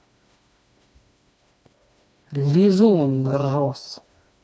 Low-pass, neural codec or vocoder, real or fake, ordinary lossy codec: none; codec, 16 kHz, 2 kbps, FreqCodec, smaller model; fake; none